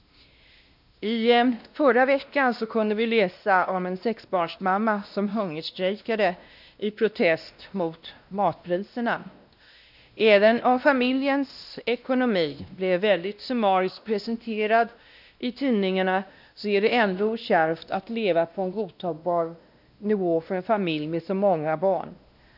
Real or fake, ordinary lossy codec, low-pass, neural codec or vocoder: fake; none; 5.4 kHz; codec, 16 kHz, 1 kbps, X-Codec, WavLM features, trained on Multilingual LibriSpeech